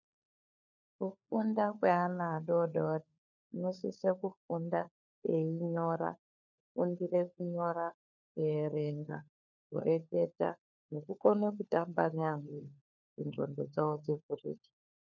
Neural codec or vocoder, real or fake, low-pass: codec, 16 kHz, 4 kbps, FunCodec, trained on LibriTTS, 50 frames a second; fake; 7.2 kHz